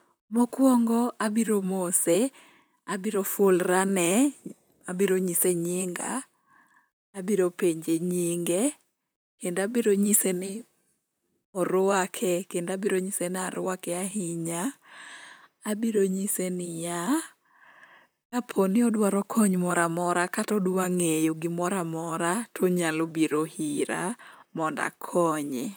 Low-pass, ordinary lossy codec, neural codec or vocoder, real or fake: none; none; vocoder, 44.1 kHz, 128 mel bands, Pupu-Vocoder; fake